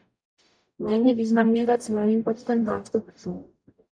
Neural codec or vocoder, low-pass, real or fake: codec, 44.1 kHz, 0.9 kbps, DAC; 9.9 kHz; fake